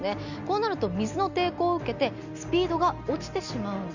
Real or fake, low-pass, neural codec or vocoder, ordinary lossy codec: real; 7.2 kHz; none; none